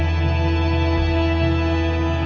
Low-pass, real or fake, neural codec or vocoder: 7.2 kHz; real; none